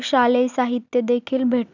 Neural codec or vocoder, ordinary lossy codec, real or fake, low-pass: none; none; real; 7.2 kHz